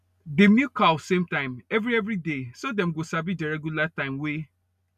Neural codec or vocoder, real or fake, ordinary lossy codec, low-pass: none; real; none; 14.4 kHz